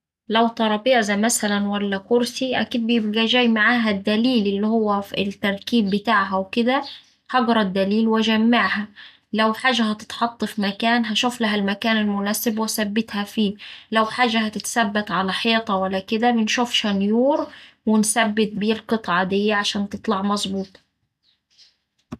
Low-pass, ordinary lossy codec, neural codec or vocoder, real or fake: 14.4 kHz; none; none; real